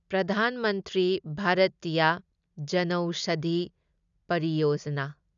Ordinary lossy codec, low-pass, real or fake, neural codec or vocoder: none; 7.2 kHz; real; none